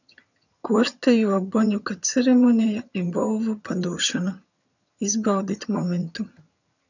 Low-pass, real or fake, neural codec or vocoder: 7.2 kHz; fake; vocoder, 22.05 kHz, 80 mel bands, HiFi-GAN